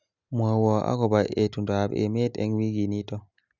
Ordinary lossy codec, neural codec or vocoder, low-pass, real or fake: none; none; 7.2 kHz; real